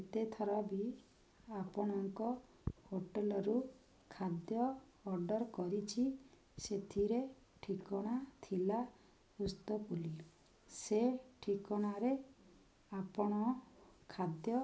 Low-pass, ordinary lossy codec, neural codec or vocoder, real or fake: none; none; none; real